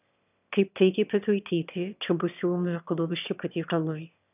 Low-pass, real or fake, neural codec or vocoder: 3.6 kHz; fake; autoencoder, 22.05 kHz, a latent of 192 numbers a frame, VITS, trained on one speaker